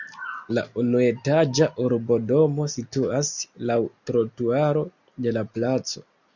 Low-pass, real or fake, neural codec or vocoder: 7.2 kHz; real; none